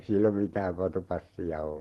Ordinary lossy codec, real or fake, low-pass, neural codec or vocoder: Opus, 16 kbps; fake; 19.8 kHz; vocoder, 44.1 kHz, 128 mel bands every 512 samples, BigVGAN v2